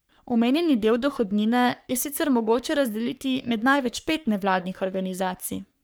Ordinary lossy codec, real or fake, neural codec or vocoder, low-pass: none; fake; codec, 44.1 kHz, 3.4 kbps, Pupu-Codec; none